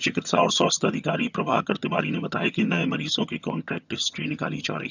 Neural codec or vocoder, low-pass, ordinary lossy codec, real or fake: vocoder, 22.05 kHz, 80 mel bands, HiFi-GAN; 7.2 kHz; none; fake